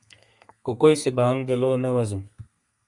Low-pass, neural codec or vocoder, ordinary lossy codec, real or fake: 10.8 kHz; codec, 32 kHz, 1.9 kbps, SNAC; Opus, 64 kbps; fake